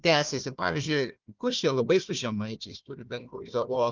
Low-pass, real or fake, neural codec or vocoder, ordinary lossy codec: 7.2 kHz; fake; codec, 16 kHz, 1 kbps, FunCodec, trained on Chinese and English, 50 frames a second; Opus, 24 kbps